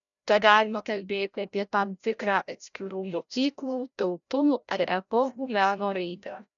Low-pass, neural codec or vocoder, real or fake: 7.2 kHz; codec, 16 kHz, 0.5 kbps, FreqCodec, larger model; fake